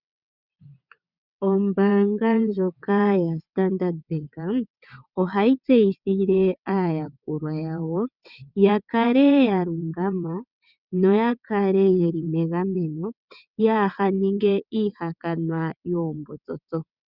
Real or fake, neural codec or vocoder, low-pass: fake; vocoder, 22.05 kHz, 80 mel bands, WaveNeXt; 5.4 kHz